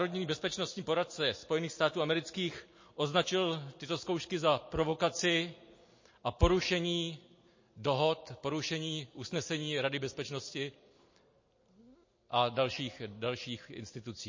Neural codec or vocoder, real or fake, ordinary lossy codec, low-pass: none; real; MP3, 32 kbps; 7.2 kHz